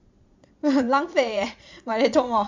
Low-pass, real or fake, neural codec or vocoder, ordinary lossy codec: 7.2 kHz; fake; vocoder, 22.05 kHz, 80 mel bands, Vocos; MP3, 64 kbps